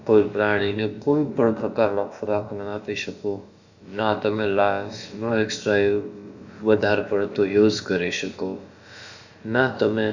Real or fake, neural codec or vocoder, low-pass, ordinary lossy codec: fake; codec, 16 kHz, about 1 kbps, DyCAST, with the encoder's durations; 7.2 kHz; Opus, 64 kbps